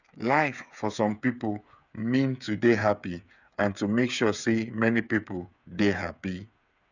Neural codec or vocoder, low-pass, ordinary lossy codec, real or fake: codec, 16 kHz, 8 kbps, FreqCodec, smaller model; 7.2 kHz; none; fake